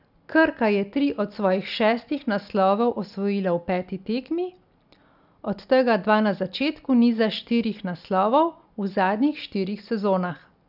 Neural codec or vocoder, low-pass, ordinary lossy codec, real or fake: none; 5.4 kHz; none; real